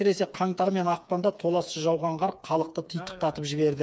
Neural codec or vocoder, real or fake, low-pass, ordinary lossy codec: codec, 16 kHz, 4 kbps, FreqCodec, smaller model; fake; none; none